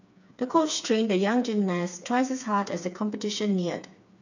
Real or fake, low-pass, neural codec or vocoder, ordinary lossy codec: fake; 7.2 kHz; codec, 16 kHz, 4 kbps, FreqCodec, smaller model; none